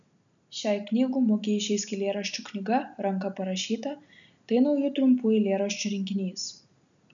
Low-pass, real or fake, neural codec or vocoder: 7.2 kHz; real; none